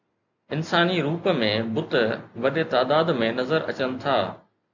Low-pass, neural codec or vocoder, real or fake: 7.2 kHz; none; real